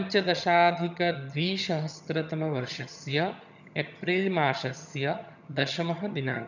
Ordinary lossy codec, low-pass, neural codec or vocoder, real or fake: none; 7.2 kHz; vocoder, 22.05 kHz, 80 mel bands, HiFi-GAN; fake